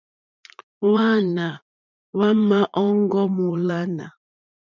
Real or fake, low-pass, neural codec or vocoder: fake; 7.2 kHz; vocoder, 44.1 kHz, 80 mel bands, Vocos